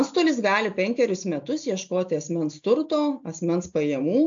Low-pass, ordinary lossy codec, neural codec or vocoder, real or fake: 7.2 kHz; AAC, 64 kbps; none; real